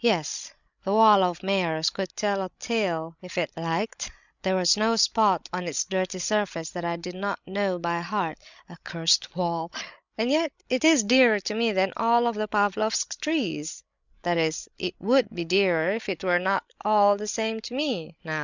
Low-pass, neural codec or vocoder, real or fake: 7.2 kHz; codec, 16 kHz, 16 kbps, FreqCodec, larger model; fake